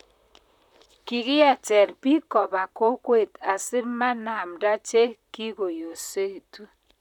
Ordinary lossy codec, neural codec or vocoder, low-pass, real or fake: none; vocoder, 44.1 kHz, 128 mel bands, Pupu-Vocoder; 19.8 kHz; fake